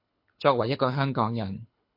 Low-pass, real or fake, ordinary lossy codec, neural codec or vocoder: 5.4 kHz; fake; MP3, 32 kbps; codec, 24 kHz, 6 kbps, HILCodec